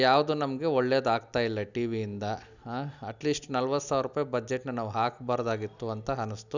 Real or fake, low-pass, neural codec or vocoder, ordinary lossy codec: real; 7.2 kHz; none; none